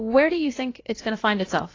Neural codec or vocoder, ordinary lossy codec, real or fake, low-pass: codec, 16 kHz, about 1 kbps, DyCAST, with the encoder's durations; AAC, 32 kbps; fake; 7.2 kHz